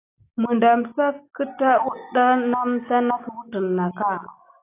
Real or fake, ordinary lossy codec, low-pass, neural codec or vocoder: real; AAC, 24 kbps; 3.6 kHz; none